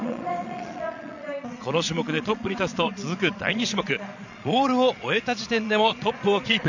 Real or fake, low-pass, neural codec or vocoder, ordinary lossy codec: fake; 7.2 kHz; vocoder, 22.05 kHz, 80 mel bands, Vocos; none